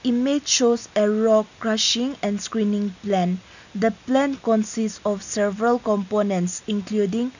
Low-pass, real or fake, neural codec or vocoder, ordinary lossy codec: 7.2 kHz; real; none; none